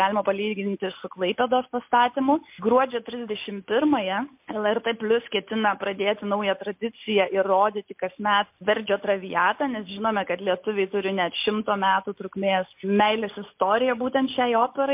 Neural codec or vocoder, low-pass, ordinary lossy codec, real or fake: none; 3.6 kHz; MP3, 32 kbps; real